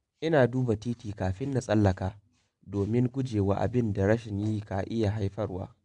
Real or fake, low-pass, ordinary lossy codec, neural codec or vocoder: real; 10.8 kHz; none; none